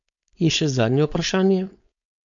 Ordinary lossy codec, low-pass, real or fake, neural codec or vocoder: none; 7.2 kHz; fake; codec, 16 kHz, 4.8 kbps, FACodec